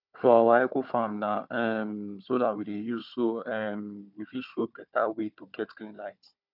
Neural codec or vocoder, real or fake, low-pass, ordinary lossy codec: codec, 16 kHz, 4 kbps, FunCodec, trained on Chinese and English, 50 frames a second; fake; 5.4 kHz; none